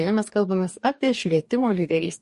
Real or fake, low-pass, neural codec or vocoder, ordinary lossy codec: fake; 14.4 kHz; codec, 44.1 kHz, 2.6 kbps, DAC; MP3, 48 kbps